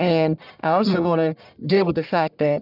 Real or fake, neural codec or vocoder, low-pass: fake; codec, 44.1 kHz, 1.7 kbps, Pupu-Codec; 5.4 kHz